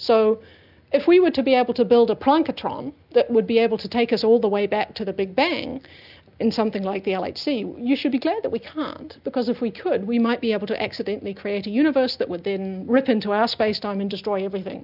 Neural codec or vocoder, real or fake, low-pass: none; real; 5.4 kHz